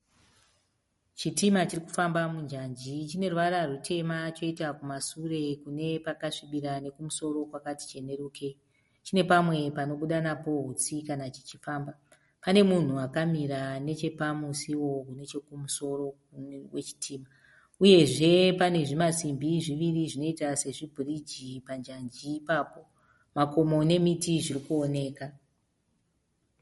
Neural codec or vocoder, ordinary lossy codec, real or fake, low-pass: none; MP3, 48 kbps; real; 14.4 kHz